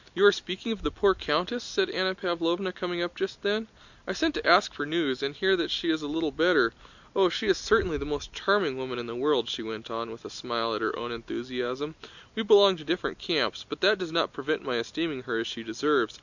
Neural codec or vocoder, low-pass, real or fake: none; 7.2 kHz; real